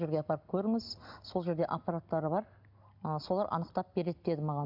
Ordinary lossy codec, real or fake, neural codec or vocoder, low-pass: none; real; none; 5.4 kHz